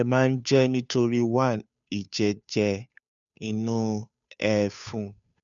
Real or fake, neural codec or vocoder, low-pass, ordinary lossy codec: fake; codec, 16 kHz, 2 kbps, FunCodec, trained on Chinese and English, 25 frames a second; 7.2 kHz; none